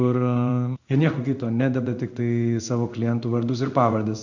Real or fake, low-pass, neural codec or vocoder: fake; 7.2 kHz; codec, 16 kHz in and 24 kHz out, 1 kbps, XY-Tokenizer